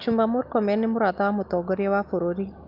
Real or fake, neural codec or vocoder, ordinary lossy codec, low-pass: real; none; Opus, 32 kbps; 5.4 kHz